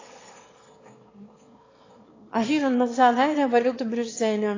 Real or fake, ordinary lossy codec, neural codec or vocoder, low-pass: fake; AAC, 32 kbps; autoencoder, 22.05 kHz, a latent of 192 numbers a frame, VITS, trained on one speaker; 7.2 kHz